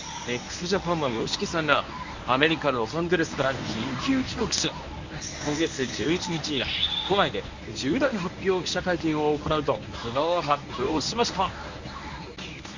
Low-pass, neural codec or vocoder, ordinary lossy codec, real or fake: 7.2 kHz; codec, 24 kHz, 0.9 kbps, WavTokenizer, medium speech release version 1; Opus, 64 kbps; fake